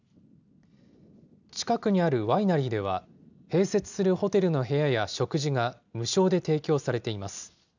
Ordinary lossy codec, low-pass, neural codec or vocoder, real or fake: none; 7.2 kHz; none; real